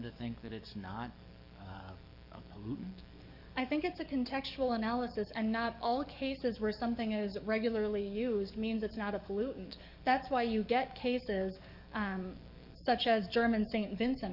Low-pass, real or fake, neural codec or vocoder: 5.4 kHz; fake; codec, 44.1 kHz, 7.8 kbps, DAC